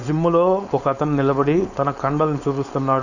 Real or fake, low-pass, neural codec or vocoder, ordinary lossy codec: fake; 7.2 kHz; codec, 16 kHz, 4.8 kbps, FACodec; none